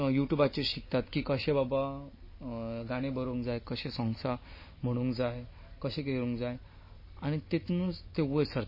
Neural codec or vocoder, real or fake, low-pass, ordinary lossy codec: none; real; 5.4 kHz; MP3, 24 kbps